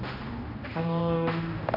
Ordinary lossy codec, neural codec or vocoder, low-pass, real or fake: none; codec, 16 kHz, 1 kbps, X-Codec, HuBERT features, trained on general audio; 5.4 kHz; fake